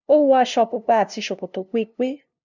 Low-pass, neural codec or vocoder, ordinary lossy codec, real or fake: 7.2 kHz; codec, 16 kHz, 0.5 kbps, FunCodec, trained on LibriTTS, 25 frames a second; none; fake